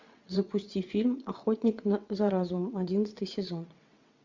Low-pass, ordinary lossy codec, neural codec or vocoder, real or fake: 7.2 kHz; MP3, 64 kbps; vocoder, 22.05 kHz, 80 mel bands, WaveNeXt; fake